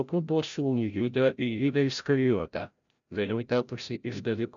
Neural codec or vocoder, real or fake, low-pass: codec, 16 kHz, 0.5 kbps, FreqCodec, larger model; fake; 7.2 kHz